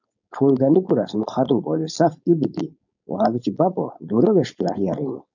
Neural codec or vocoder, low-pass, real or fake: codec, 16 kHz, 4.8 kbps, FACodec; 7.2 kHz; fake